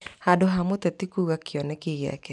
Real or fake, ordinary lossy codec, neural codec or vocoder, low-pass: real; none; none; 10.8 kHz